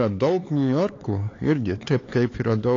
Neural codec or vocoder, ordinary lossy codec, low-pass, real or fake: codec, 16 kHz, 4 kbps, X-Codec, HuBERT features, trained on LibriSpeech; AAC, 32 kbps; 7.2 kHz; fake